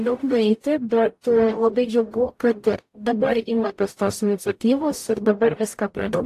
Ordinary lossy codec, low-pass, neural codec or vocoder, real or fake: AAC, 64 kbps; 14.4 kHz; codec, 44.1 kHz, 0.9 kbps, DAC; fake